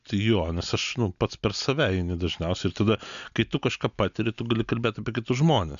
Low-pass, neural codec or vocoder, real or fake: 7.2 kHz; none; real